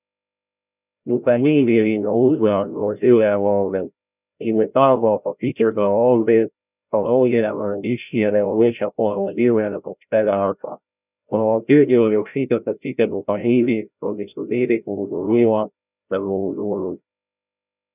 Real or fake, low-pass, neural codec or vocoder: fake; 3.6 kHz; codec, 16 kHz, 0.5 kbps, FreqCodec, larger model